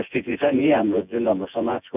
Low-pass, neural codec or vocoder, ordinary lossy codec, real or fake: 3.6 kHz; vocoder, 24 kHz, 100 mel bands, Vocos; none; fake